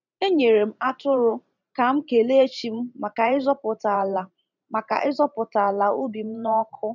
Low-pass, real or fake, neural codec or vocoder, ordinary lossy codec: 7.2 kHz; fake; vocoder, 44.1 kHz, 128 mel bands every 512 samples, BigVGAN v2; none